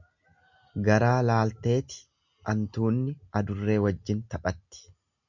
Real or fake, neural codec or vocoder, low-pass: real; none; 7.2 kHz